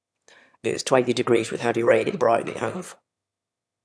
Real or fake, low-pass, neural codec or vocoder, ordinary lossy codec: fake; none; autoencoder, 22.05 kHz, a latent of 192 numbers a frame, VITS, trained on one speaker; none